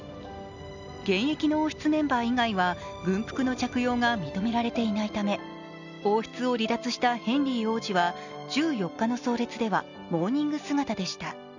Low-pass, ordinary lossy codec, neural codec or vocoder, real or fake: 7.2 kHz; none; none; real